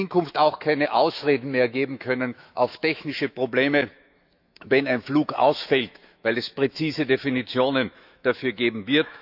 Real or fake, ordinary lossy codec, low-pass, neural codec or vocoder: fake; none; 5.4 kHz; autoencoder, 48 kHz, 128 numbers a frame, DAC-VAE, trained on Japanese speech